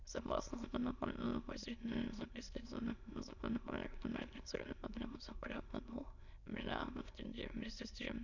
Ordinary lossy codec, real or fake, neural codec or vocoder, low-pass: none; fake; autoencoder, 22.05 kHz, a latent of 192 numbers a frame, VITS, trained on many speakers; 7.2 kHz